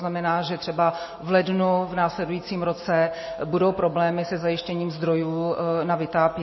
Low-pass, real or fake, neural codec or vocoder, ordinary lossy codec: 7.2 kHz; real; none; MP3, 24 kbps